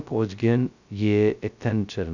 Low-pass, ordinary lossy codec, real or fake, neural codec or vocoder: 7.2 kHz; none; fake; codec, 16 kHz, 0.2 kbps, FocalCodec